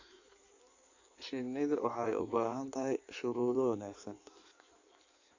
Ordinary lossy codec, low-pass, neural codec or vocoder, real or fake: AAC, 48 kbps; 7.2 kHz; codec, 16 kHz in and 24 kHz out, 1.1 kbps, FireRedTTS-2 codec; fake